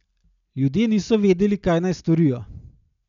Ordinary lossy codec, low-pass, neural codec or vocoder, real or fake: none; 7.2 kHz; none; real